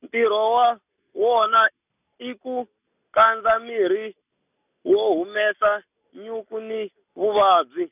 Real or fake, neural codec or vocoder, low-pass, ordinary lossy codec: real; none; 3.6 kHz; none